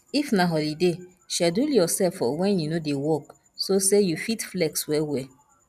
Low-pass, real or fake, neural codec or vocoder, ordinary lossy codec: 14.4 kHz; real; none; none